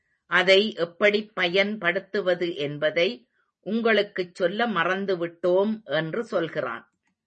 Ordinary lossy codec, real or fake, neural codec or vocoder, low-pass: MP3, 32 kbps; real; none; 10.8 kHz